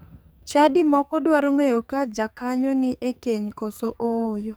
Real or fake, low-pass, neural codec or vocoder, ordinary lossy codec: fake; none; codec, 44.1 kHz, 2.6 kbps, SNAC; none